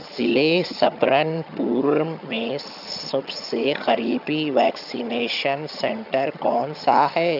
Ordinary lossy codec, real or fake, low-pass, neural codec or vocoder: none; fake; 5.4 kHz; vocoder, 22.05 kHz, 80 mel bands, HiFi-GAN